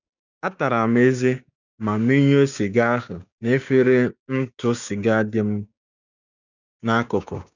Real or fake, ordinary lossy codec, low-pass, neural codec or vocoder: fake; none; 7.2 kHz; codec, 16 kHz, 6 kbps, DAC